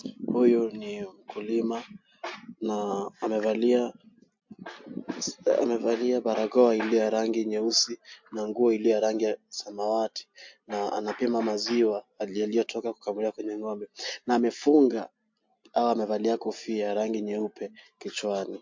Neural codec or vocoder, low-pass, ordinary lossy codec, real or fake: none; 7.2 kHz; MP3, 48 kbps; real